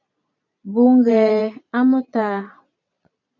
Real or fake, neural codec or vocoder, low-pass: fake; vocoder, 22.05 kHz, 80 mel bands, Vocos; 7.2 kHz